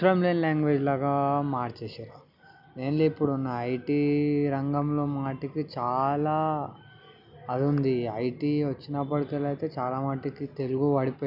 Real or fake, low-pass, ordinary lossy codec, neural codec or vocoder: real; 5.4 kHz; none; none